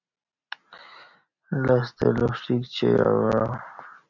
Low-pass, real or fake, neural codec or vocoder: 7.2 kHz; real; none